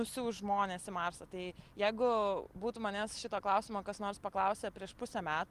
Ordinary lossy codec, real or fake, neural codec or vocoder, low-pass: Opus, 24 kbps; real; none; 14.4 kHz